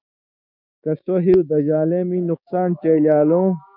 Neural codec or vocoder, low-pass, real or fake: codec, 16 kHz, 6 kbps, DAC; 5.4 kHz; fake